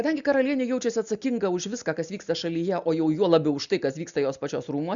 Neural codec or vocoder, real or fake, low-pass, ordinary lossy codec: none; real; 7.2 kHz; MP3, 96 kbps